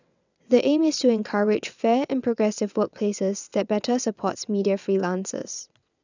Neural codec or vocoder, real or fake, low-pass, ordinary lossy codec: vocoder, 22.05 kHz, 80 mel bands, WaveNeXt; fake; 7.2 kHz; none